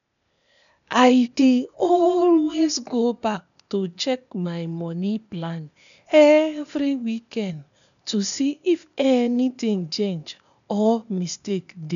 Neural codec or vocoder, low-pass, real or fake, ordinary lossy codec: codec, 16 kHz, 0.8 kbps, ZipCodec; 7.2 kHz; fake; none